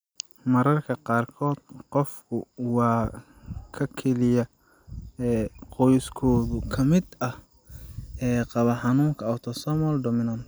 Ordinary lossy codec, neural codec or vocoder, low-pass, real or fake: none; none; none; real